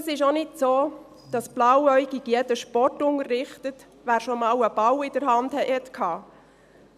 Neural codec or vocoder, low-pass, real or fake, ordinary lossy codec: none; 14.4 kHz; real; none